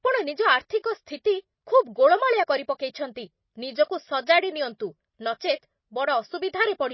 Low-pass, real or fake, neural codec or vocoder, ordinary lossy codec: 7.2 kHz; real; none; MP3, 24 kbps